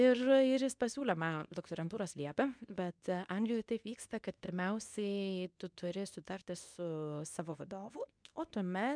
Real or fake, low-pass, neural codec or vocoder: fake; 9.9 kHz; codec, 24 kHz, 0.9 kbps, WavTokenizer, medium speech release version 2